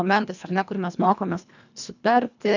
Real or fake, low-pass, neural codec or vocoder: fake; 7.2 kHz; codec, 24 kHz, 1.5 kbps, HILCodec